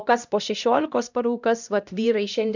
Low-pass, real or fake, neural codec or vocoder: 7.2 kHz; fake; codec, 16 kHz, 1 kbps, X-Codec, HuBERT features, trained on LibriSpeech